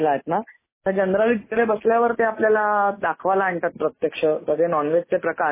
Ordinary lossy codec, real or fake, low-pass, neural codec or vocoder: MP3, 16 kbps; real; 3.6 kHz; none